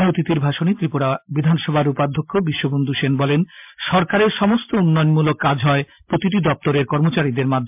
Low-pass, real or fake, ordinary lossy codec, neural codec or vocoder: 3.6 kHz; real; MP3, 32 kbps; none